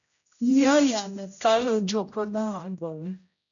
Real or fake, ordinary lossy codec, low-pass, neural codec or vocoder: fake; MP3, 96 kbps; 7.2 kHz; codec, 16 kHz, 0.5 kbps, X-Codec, HuBERT features, trained on general audio